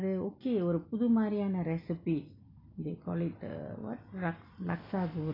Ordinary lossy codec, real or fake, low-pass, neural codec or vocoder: AAC, 24 kbps; real; 5.4 kHz; none